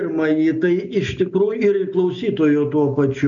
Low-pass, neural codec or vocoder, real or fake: 7.2 kHz; none; real